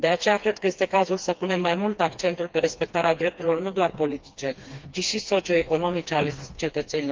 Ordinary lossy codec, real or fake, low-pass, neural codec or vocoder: Opus, 24 kbps; fake; 7.2 kHz; codec, 16 kHz, 2 kbps, FreqCodec, smaller model